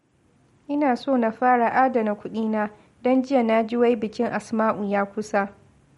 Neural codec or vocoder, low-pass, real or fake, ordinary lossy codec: none; 19.8 kHz; real; MP3, 48 kbps